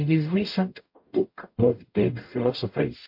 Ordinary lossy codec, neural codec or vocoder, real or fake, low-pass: MP3, 32 kbps; codec, 44.1 kHz, 0.9 kbps, DAC; fake; 5.4 kHz